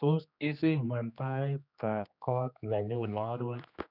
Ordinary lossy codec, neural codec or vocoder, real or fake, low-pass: none; codec, 16 kHz, 2 kbps, X-Codec, HuBERT features, trained on general audio; fake; 5.4 kHz